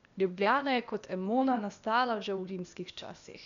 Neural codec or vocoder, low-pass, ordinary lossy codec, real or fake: codec, 16 kHz, 0.8 kbps, ZipCodec; 7.2 kHz; none; fake